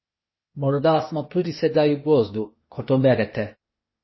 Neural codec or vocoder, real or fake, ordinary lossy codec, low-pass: codec, 16 kHz, 0.8 kbps, ZipCodec; fake; MP3, 24 kbps; 7.2 kHz